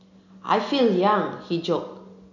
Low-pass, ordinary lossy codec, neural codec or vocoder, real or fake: 7.2 kHz; none; none; real